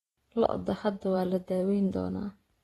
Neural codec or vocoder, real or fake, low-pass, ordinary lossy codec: vocoder, 44.1 kHz, 128 mel bands every 512 samples, BigVGAN v2; fake; 19.8 kHz; AAC, 32 kbps